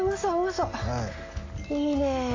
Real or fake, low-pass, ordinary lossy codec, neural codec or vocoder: real; 7.2 kHz; AAC, 32 kbps; none